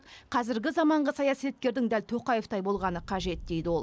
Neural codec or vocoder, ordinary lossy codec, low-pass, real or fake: none; none; none; real